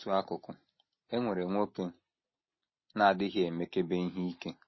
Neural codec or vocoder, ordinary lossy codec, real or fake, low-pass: none; MP3, 24 kbps; real; 7.2 kHz